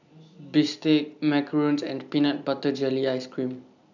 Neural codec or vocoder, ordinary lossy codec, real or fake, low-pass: none; none; real; 7.2 kHz